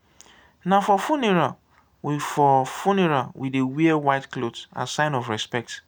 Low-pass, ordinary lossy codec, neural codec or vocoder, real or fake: none; none; none; real